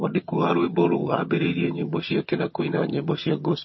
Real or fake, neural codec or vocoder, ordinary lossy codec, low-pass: fake; vocoder, 22.05 kHz, 80 mel bands, HiFi-GAN; MP3, 24 kbps; 7.2 kHz